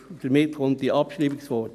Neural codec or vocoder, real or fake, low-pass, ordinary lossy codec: none; real; 14.4 kHz; none